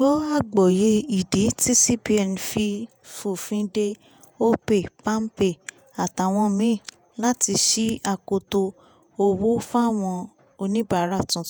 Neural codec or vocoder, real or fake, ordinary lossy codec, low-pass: vocoder, 48 kHz, 128 mel bands, Vocos; fake; none; none